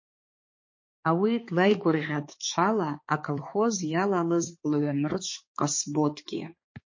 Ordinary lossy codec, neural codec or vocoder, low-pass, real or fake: MP3, 32 kbps; codec, 16 kHz, 4 kbps, X-Codec, HuBERT features, trained on balanced general audio; 7.2 kHz; fake